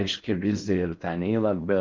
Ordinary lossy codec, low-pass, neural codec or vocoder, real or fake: Opus, 24 kbps; 7.2 kHz; codec, 16 kHz in and 24 kHz out, 0.6 kbps, FocalCodec, streaming, 4096 codes; fake